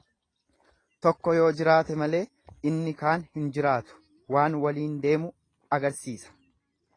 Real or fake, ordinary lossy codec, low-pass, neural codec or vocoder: real; AAC, 32 kbps; 9.9 kHz; none